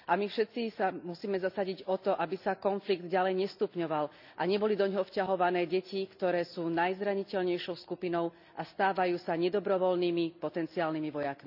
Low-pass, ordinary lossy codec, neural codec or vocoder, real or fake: 5.4 kHz; none; none; real